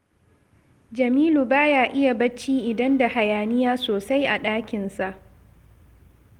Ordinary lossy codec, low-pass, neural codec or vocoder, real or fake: Opus, 24 kbps; 19.8 kHz; none; real